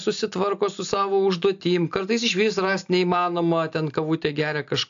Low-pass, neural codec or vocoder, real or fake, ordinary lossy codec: 7.2 kHz; none; real; AAC, 64 kbps